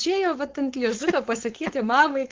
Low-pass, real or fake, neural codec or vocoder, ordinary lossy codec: 7.2 kHz; fake; codec, 16 kHz, 4.8 kbps, FACodec; Opus, 16 kbps